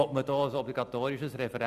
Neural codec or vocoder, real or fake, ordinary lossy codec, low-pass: none; real; none; 14.4 kHz